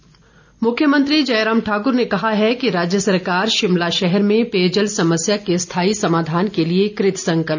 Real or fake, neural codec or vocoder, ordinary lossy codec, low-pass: real; none; none; 7.2 kHz